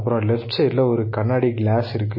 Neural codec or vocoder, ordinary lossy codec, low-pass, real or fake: none; MP3, 24 kbps; 5.4 kHz; real